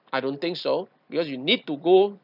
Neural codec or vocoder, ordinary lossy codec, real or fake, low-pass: none; none; real; 5.4 kHz